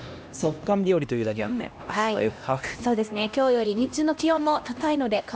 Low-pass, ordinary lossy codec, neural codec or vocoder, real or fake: none; none; codec, 16 kHz, 1 kbps, X-Codec, HuBERT features, trained on LibriSpeech; fake